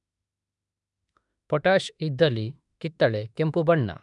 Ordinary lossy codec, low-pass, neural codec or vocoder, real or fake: none; 10.8 kHz; autoencoder, 48 kHz, 32 numbers a frame, DAC-VAE, trained on Japanese speech; fake